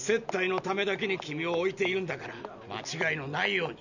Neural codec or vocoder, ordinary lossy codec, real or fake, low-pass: none; none; real; 7.2 kHz